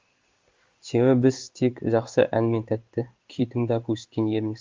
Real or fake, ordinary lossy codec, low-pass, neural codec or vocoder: fake; Opus, 32 kbps; 7.2 kHz; codec, 16 kHz in and 24 kHz out, 1 kbps, XY-Tokenizer